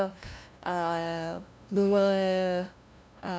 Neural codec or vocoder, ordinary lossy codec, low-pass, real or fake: codec, 16 kHz, 0.5 kbps, FunCodec, trained on LibriTTS, 25 frames a second; none; none; fake